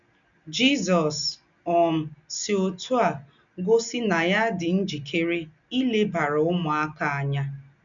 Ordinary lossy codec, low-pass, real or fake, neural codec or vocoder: none; 7.2 kHz; real; none